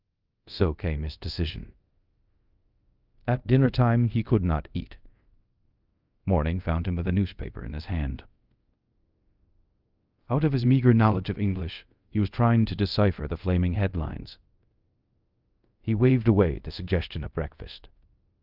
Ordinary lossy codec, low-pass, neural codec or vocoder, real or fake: Opus, 24 kbps; 5.4 kHz; codec, 24 kHz, 0.5 kbps, DualCodec; fake